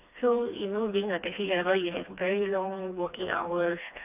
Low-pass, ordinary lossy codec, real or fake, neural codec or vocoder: 3.6 kHz; none; fake; codec, 16 kHz, 2 kbps, FreqCodec, smaller model